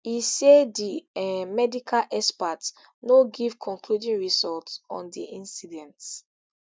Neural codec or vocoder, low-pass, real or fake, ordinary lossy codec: none; none; real; none